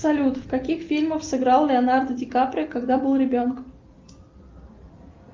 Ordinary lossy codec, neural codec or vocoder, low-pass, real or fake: Opus, 32 kbps; none; 7.2 kHz; real